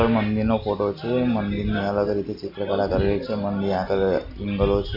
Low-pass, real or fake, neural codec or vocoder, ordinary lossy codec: 5.4 kHz; real; none; MP3, 48 kbps